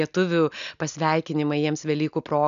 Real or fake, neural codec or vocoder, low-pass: real; none; 7.2 kHz